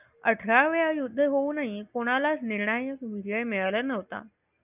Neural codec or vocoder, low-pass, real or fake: none; 3.6 kHz; real